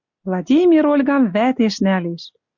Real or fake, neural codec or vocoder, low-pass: real; none; 7.2 kHz